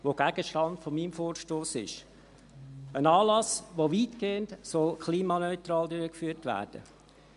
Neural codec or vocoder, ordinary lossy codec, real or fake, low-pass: none; MP3, 64 kbps; real; 10.8 kHz